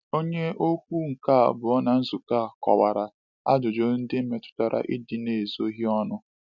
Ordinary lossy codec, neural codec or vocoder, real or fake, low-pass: none; none; real; 7.2 kHz